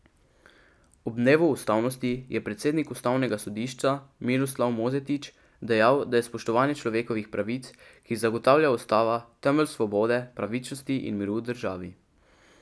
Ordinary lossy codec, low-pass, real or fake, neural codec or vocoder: none; none; real; none